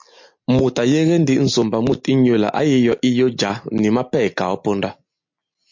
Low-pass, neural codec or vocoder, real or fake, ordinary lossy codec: 7.2 kHz; none; real; MP3, 48 kbps